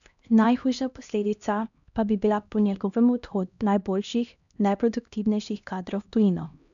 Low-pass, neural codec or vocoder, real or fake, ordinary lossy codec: 7.2 kHz; codec, 16 kHz, 1 kbps, X-Codec, HuBERT features, trained on LibriSpeech; fake; none